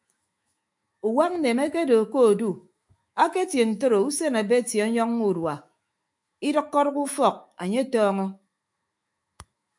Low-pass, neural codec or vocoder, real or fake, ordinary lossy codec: 10.8 kHz; autoencoder, 48 kHz, 128 numbers a frame, DAC-VAE, trained on Japanese speech; fake; MP3, 64 kbps